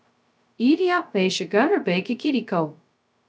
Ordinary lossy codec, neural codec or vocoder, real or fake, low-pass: none; codec, 16 kHz, 0.2 kbps, FocalCodec; fake; none